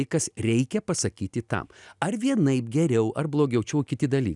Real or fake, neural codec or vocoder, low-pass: real; none; 10.8 kHz